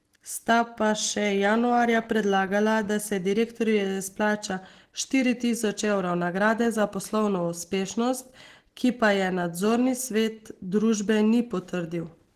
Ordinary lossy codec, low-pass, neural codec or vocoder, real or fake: Opus, 16 kbps; 14.4 kHz; none; real